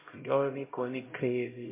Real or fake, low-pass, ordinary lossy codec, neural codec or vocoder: fake; 3.6 kHz; MP3, 24 kbps; codec, 16 kHz, 0.5 kbps, X-Codec, HuBERT features, trained on LibriSpeech